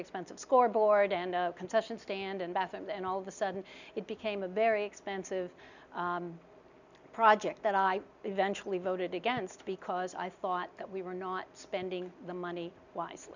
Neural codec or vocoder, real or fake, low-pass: none; real; 7.2 kHz